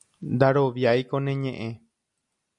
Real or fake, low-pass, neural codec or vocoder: real; 10.8 kHz; none